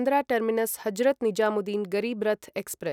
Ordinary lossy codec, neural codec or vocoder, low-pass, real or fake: none; none; 19.8 kHz; real